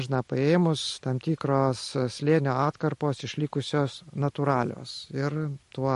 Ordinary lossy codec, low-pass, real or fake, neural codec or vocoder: MP3, 48 kbps; 14.4 kHz; real; none